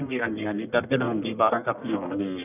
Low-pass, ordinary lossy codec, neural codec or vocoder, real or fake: 3.6 kHz; none; codec, 44.1 kHz, 1.7 kbps, Pupu-Codec; fake